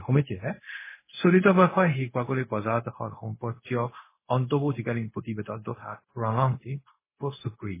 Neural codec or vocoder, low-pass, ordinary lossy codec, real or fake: codec, 16 kHz, 0.4 kbps, LongCat-Audio-Codec; 3.6 kHz; MP3, 16 kbps; fake